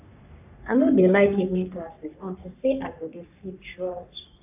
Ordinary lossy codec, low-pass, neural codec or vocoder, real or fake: AAC, 32 kbps; 3.6 kHz; codec, 44.1 kHz, 3.4 kbps, Pupu-Codec; fake